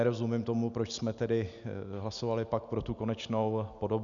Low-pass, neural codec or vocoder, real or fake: 7.2 kHz; none; real